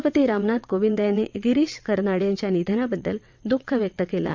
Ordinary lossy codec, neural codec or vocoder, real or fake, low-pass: MP3, 64 kbps; vocoder, 22.05 kHz, 80 mel bands, WaveNeXt; fake; 7.2 kHz